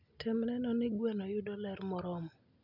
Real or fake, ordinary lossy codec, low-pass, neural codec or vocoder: real; none; 5.4 kHz; none